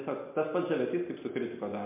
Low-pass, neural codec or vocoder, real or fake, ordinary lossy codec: 3.6 kHz; none; real; MP3, 32 kbps